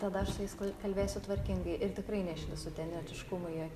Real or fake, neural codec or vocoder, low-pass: real; none; 14.4 kHz